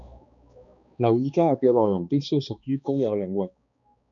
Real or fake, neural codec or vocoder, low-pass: fake; codec, 16 kHz, 2 kbps, X-Codec, HuBERT features, trained on balanced general audio; 7.2 kHz